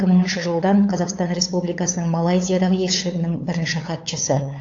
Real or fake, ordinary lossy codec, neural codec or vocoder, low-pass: fake; AAC, 48 kbps; codec, 16 kHz, 8 kbps, FunCodec, trained on LibriTTS, 25 frames a second; 7.2 kHz